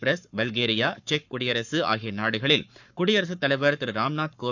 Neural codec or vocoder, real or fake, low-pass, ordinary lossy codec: codec, 44.1 kHz, 7.8 kbps, Pupu-Codec; fake; 7.2 kHz; none